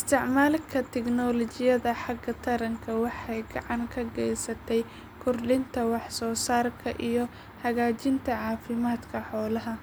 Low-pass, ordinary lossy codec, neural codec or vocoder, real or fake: none; none; none; real